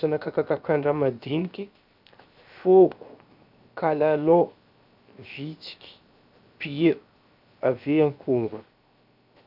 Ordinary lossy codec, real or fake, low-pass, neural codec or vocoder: none; fake; 5.4 kHz; codec, 16 kHz, 0.7 kbps, FocalCodec